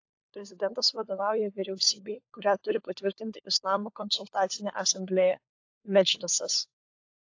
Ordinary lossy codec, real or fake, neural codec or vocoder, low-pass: AAC, 48 kbps; fake; codec, 16 kHz, 4 kbps, FunCodec, trained on LibriTTS, 50 frames a second; 7.2 kHz